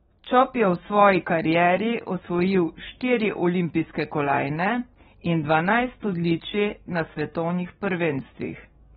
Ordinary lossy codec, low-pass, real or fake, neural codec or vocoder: AAC, 16 kbps; 19.8 kHz; fake; codec, 44.1 kHz, 7.8 kbps, DAC